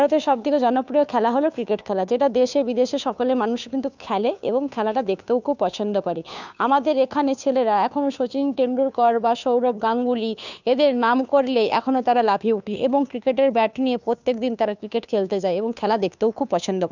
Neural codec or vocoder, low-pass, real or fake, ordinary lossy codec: codec, 16 kHz, 2 kbps, FunCodec, trained on Chinese and English, 25 frames a second; 7.2 kHz; fake; none